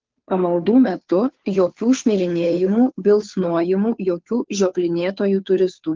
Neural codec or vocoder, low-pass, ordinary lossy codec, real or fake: codec, 16 kHz, 2 kbps, FunCodec, trained on Chinese and English, 25 frames a second; 7.2 kHz; Opus, 24 kbps; fake